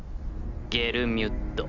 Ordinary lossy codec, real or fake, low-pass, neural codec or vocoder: none; real; 7.2 kHz; none